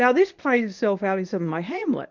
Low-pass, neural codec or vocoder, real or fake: 7.2 kHz; codec, 24 kHz, 0.9 kbps, WavTokenizer, medium speech release version 1; fake